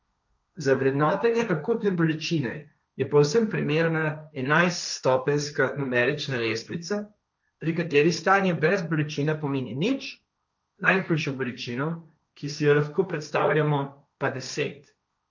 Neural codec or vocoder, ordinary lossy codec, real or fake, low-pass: codec, 16 kHz, 1.1 kbps, Voila-Tokenizer; none; fake; 7.2 kHz